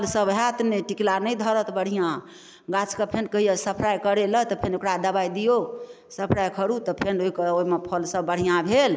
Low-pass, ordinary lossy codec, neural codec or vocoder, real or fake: none; none; none; real